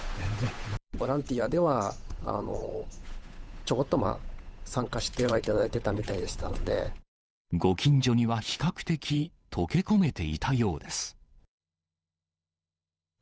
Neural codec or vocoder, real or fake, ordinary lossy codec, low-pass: codec, 16 kHz, 8 kbps, FunCodec, trained on Chinese and English, 25 frames a second; fake; none; none